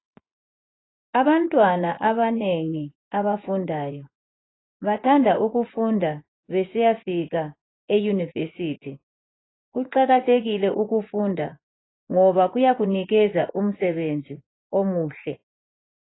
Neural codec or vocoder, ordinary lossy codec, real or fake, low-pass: vocoder, 24 kHz, 100 mel bands, Vocos; AAC, 16 kbps; fake; 7.2 kHz